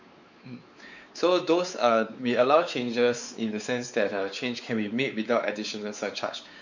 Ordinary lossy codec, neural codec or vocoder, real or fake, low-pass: none; codec, 16 kHz, 4 kbps, X-Codec, WavLM features, trained on Multilingual LibriSpeech; fake; 7.2 kHz